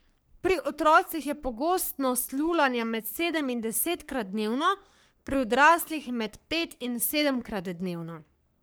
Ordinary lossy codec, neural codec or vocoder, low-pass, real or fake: none; codec, 44.1 kHz, 3.4 kbps, Pupu-Codec; none; fake